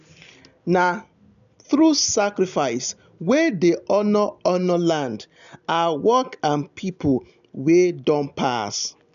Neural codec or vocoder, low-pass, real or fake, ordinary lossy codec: none; 7.2 kHz; real; none